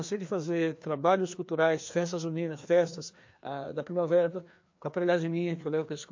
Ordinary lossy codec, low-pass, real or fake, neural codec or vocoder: MP3, 48 kbps; 7.2 kHz; fake; codec, 16 kHz, 2 kbps, FreqCodec, larger model